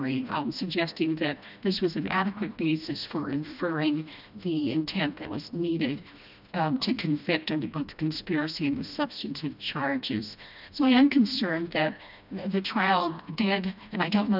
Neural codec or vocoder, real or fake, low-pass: codec, 16 kHz, 1 kbps, FreqCodec, smaller model; fake; 5.4 kHz